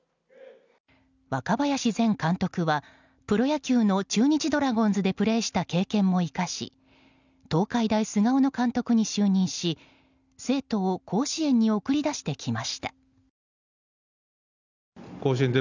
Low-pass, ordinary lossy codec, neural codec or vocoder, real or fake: 7.2 kHz; none; none; real